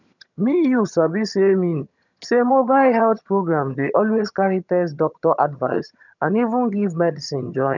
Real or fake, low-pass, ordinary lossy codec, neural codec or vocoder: fake; 7.2 kHz; none; vocoder, 22.05 kHz, 80 mel bands, HiFi-GAN